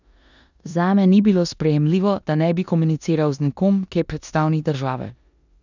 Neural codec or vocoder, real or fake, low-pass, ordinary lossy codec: codec, 16 kHz in and 24 kHz out, 0.9 kbps, LongCat-Audio-Codec, four codebook decoder; fake; 7.2 kHz; none